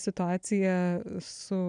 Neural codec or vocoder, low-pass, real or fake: none; 9.9 kHz; real